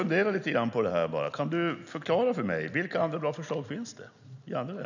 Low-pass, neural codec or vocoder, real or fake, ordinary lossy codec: 7.2 kHz; none; real; none